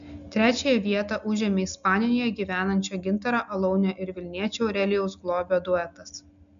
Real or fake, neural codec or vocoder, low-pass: real; none; 7.2 kHz